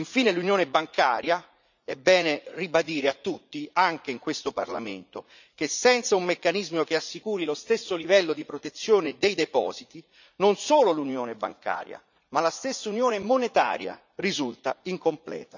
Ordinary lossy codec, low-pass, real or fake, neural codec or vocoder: none; 7.2 kHz; fake; vocoder, 44.1 kHz, 80 mel bands, Vocos